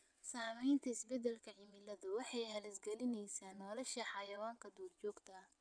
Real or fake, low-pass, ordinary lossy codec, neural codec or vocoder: fake; 9.9 kHz; none; vocoder, 22.05 kHz, 80 mel bands, WaveNeXt